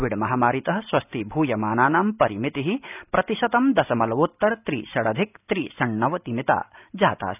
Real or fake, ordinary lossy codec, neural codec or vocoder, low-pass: real; none; none; 3.6 kHz